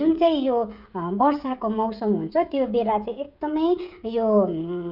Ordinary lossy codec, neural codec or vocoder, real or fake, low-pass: none; codec, 16 kHz, 16 kbps, FreqCodec, smaller model; fake; 5.4 kHz